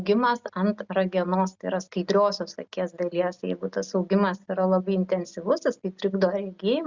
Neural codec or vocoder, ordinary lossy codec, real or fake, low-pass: none; Opus, 64 kbps; real; 7.2 kHz